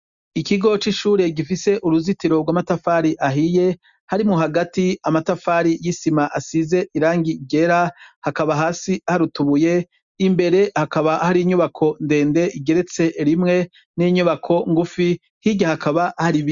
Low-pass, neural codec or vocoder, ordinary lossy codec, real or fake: 7.2 kHz; none; Opus, 64 kbps; real